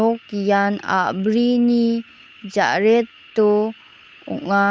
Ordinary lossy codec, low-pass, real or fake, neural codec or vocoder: none; none; fake; codec, 16 kHz, 8 kbps, FunCodec, trained on Chinese and English, 25 frames a second